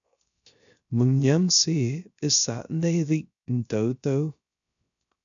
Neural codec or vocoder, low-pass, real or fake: codec, 16 kHz, 0.3 kbps, FocalCodec; 7.2 kHz; fake